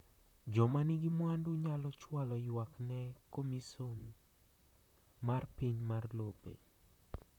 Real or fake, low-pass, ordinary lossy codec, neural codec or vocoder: fake; 19.8 kHz; none; vocoder, 44.1 kHz, 128 mel bands, Pupu-Vocoder